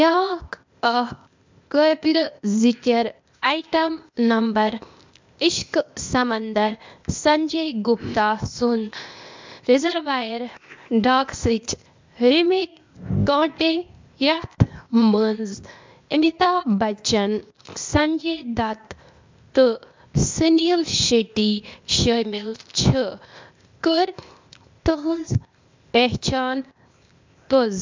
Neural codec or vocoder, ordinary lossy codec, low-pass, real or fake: codec, 16 kHz, 0.8 kbps, ZipCodec; MP3, 64 kbps; 7.2 kHz; fake